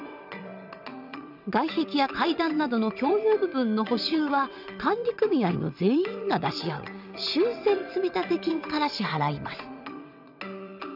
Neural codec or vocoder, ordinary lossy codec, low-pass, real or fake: vocoder, 22.05 kHz, 80 mel bands, WaveNeXt; none; 5.4 kHz; fake